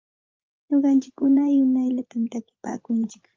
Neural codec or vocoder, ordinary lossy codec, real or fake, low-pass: vocoder, 44.1 kHz, 80 mel bands, Vocos; Opus, 32 kbps; fake; 7.2 kHz